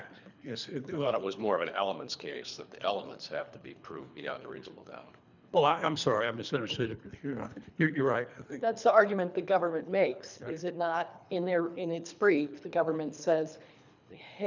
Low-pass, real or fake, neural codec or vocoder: 7.2 kHz; fake; codec, 24 kHz, 3 kbps, HILCodec